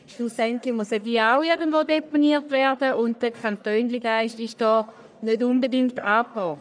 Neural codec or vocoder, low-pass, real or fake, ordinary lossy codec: codec, 44.1 kHz, 1.7 kbps, Pupu-Codec; 9.9 kHz; fake; none